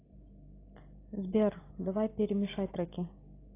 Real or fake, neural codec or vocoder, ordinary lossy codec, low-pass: real; none; AAC, 16 kbps; 3.6 kHz